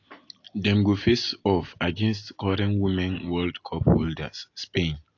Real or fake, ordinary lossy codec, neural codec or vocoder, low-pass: real; AAC, 48 kbps; none; 7.2 kHz